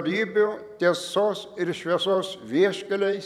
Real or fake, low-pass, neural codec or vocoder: fake; 19.8 kHz; vocoder, 44.1 kHz, 128 mel bands every 512 samples, BigVGAN v2